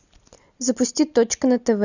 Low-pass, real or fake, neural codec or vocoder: 7.2 kHz; real; none